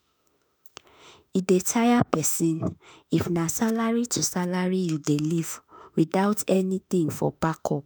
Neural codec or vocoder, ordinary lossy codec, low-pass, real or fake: autoencoder, 48 kHz, 32 numbers a frame, DAC-VAE, trained on Japanese speech; none; none; fake